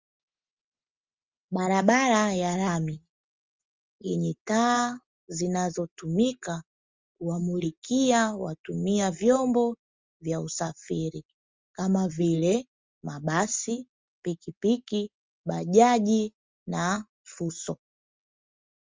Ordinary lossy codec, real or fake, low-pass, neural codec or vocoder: Opus, 32 kbps; real; 7.2 kHz; none